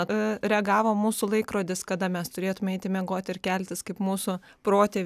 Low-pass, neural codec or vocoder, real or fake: 14.4 kHz; none; real